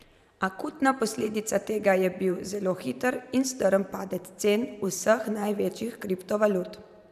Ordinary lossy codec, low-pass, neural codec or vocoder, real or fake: none; 14.4 kHz; vocoder, 44.1 kHz, 128 mel bands every 512 samples, BigVGAN v2; fake